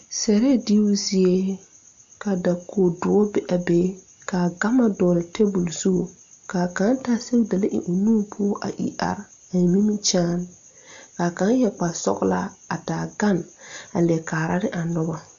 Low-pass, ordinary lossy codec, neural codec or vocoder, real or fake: 7.2 kHz; MP3, 64 kbps; none; real